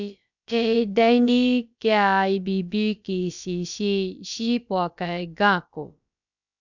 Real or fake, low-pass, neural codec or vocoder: fake; 7.2 kHz; codec, 16 kHz, about 1 kbps, DyCAST, with the encoder's durations